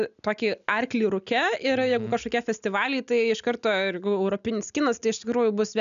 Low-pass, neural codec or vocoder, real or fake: 7.2 kHz; none; real